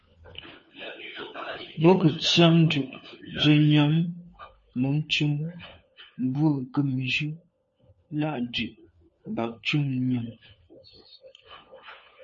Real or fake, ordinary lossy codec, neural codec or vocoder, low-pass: fake; MP3, 32 kbps; codec, 16 kHz, 4 kbps, FunCodec, trained on LibriTTS, 50 frames a second; 7.2 kHz